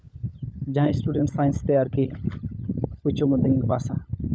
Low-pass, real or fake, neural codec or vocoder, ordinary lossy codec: none; fake; codec, 16 kHz, 16 kbps, FunCodec, trained on LibriTTS, 50 frames a second; none